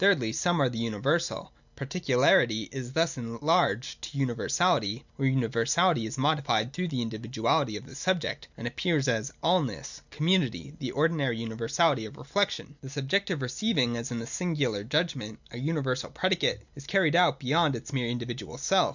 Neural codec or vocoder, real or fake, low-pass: none; real; 7.2 kHz